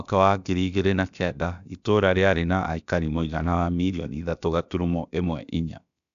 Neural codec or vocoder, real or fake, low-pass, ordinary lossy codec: codec, 16 kHz, about 1 kbps, DyCAST, with the encoder's durations; fake; 7.2 kHz; none